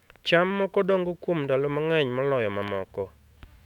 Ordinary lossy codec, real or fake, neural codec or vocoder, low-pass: none; fake; autoencoder, 48 kHz, 128 numbers a frame, DAC-VAE, trained on Japanese speech; 19.8 kHz